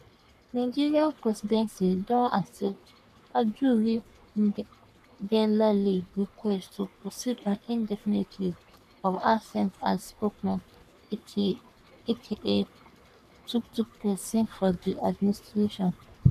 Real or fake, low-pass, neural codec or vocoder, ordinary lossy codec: fake; 14.4 kHz; codec, 44.1 kHz, 3.4 kbps, Pupu-Codec; none